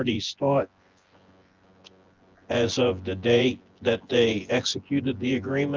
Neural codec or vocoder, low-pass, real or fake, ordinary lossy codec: vocoder, 24 kHz, 100 mel bands, Vocos; 7.2 kHz; fake; Opus, 24 kbps